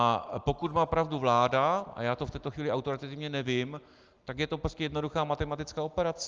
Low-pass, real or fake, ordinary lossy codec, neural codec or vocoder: 7.2 kHz; real; Opus, 24 kbps; none